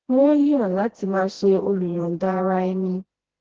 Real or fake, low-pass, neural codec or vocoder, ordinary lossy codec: fake; 7.2 kHz; codec, 16 kHz, 1 kbps, FreqCodec, smaller model; Opus, 16 kbps